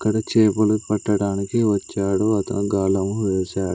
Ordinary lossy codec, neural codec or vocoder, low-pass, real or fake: none; none; none; real